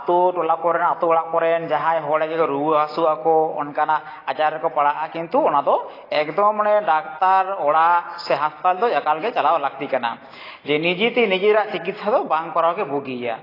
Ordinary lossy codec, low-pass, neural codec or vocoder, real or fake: AAC, 24 kbps; 5.4 kHz; none; real